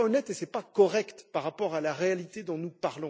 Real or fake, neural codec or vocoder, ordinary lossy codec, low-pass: real; none; none; none